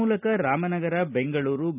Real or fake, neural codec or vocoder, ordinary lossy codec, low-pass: real; none; none; 3.6 kHz